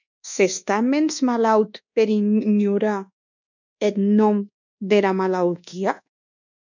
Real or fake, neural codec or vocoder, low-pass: fake; codec, 24 kHz, 1.2 kbps, DualCodec; 7.2 kHz